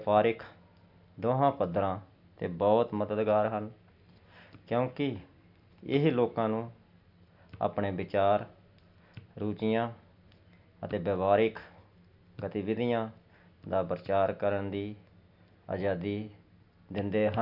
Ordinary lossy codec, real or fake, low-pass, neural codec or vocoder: none; real; 5.4 kHz; none